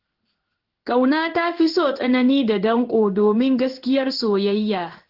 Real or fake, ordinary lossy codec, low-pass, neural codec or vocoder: fake; Opus, 16 kbps; 5.4 kHz; codec, 16 kHz in and 24 kHz out, 1 kbps, XY-Tokenizer